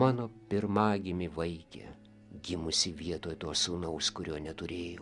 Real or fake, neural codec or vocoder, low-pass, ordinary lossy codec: real; none; 10.8 kHz; Opus, 64 kbps